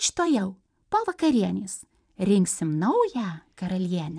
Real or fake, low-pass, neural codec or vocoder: fake; 9.9 kHz; vocoder, 44.1 kHz, 128 mel bands every 512 samples, BigVGAN v2